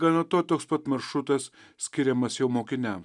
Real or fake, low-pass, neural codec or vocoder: real; 10.8 kHz; none